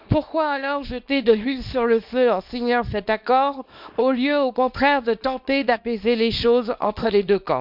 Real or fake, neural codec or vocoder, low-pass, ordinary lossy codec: fake; codec, 24 kHz, 0.9 kbps, WavTokenizer, small release; 5.4 kHz; none